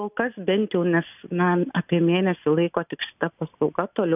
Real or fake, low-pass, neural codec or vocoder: real; 3.6 kHz; none